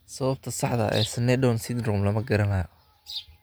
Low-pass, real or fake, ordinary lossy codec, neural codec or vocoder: none; real; none; none